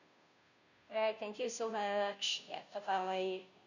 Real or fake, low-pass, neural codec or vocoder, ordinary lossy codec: fake; 7.2 kHz; codec, 16 kHz, 0.5 kbps, FunCodec, trained on Chinese and English, 25 frames a second; none